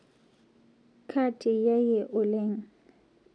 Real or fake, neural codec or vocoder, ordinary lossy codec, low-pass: real; none; none; 9.9 kHz